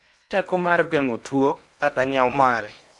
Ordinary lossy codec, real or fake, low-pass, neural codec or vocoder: none; fake; 10.8 kHz; codec, 16 kHz in and 24 kHz out, 0.8 kbps, FocalCodec, streaming, 65536 codes